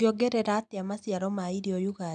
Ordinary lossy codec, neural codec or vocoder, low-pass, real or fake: none; none; 9.9 kHz; real